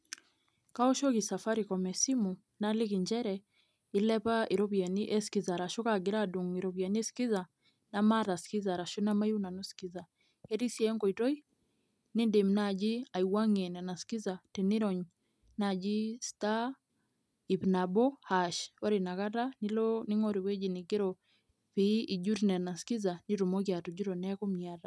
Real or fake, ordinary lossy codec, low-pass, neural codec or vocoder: real; none; none; none